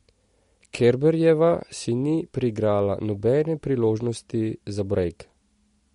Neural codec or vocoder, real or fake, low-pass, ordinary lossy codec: none; real; 10.8 kHz; MP3, 48 kbps